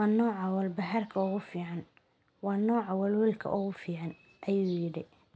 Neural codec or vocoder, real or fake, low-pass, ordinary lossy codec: none; real; none; none